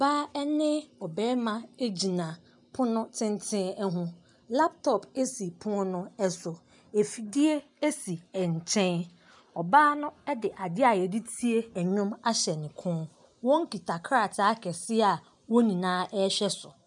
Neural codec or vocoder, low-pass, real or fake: none; 10.8 kHz; real